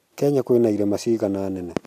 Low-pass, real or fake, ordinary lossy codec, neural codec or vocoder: 14.4 kHz; real; none; none